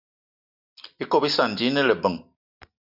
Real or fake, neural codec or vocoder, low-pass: real; none; 5.4 kHz